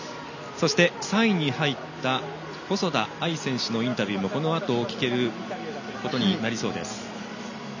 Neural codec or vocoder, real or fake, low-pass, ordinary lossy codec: none; real; 7.2 kHz; none